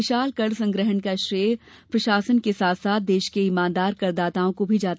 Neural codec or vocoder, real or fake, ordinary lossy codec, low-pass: none; real; none; none